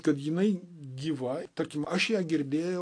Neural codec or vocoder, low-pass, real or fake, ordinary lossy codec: none; 9.9 kHz; real; AAC, 48 kbps